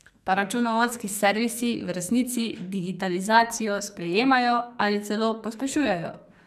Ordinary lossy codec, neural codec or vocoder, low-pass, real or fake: none; codec, 44.1 kHz, 2.6 kbps, SNAC; 14.4 kHz; fake